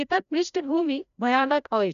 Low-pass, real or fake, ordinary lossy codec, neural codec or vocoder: 7.2 kHz; fake; none; codec, 16 kHz, 0.5 kbps, FreqCodec, larger model